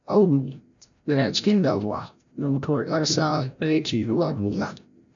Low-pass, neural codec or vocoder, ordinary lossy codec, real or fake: 7.2 kHz; codec, 16 kHz, 0.5 kbps, FreqCodec, larger model; none; fake